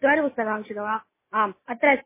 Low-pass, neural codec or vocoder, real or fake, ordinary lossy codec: 3.6 kHz; none; real; MP3, 16 kbps